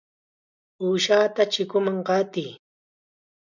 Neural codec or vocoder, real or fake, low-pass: none; real; 7.2 kHz